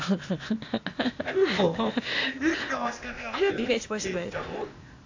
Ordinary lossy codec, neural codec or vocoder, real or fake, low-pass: AAC, 48 kbps; codec, 16 kHz, 0.8 kbps, ZipCodec; fake; 7.2 kHz